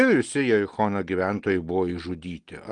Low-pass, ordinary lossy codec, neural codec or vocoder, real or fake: 9.9 kHz; Opus, 24 kbps; none; real